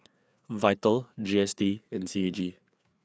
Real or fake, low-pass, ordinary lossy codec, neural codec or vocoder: fake; none; none; codec, 16 kHz, 4 kbps, FreqCodec, larger model